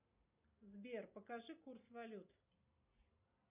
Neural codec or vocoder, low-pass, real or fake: none; 3.6 kHz; real